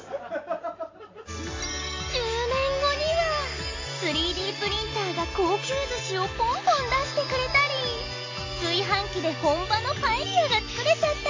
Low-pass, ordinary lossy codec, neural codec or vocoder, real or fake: 7.2 kHz; AAC, 32 kbps; none; real